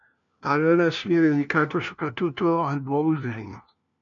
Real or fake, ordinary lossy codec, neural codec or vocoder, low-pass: fake; MP3, 96 kbps; codec, 16 kHz, 1 kbps, FunCodec, trained on LibriTTS, 50 frames a second; 7.2 kHz